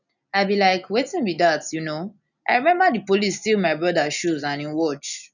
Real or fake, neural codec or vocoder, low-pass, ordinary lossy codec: real; none; 7.2 kHz; none